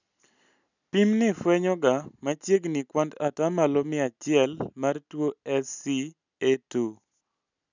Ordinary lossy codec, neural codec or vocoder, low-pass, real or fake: none; none; 7.2 kHz; real